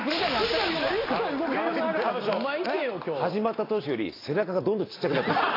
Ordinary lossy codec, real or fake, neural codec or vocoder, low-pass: AAC, 24 kbps; real; none; 5.4 kHz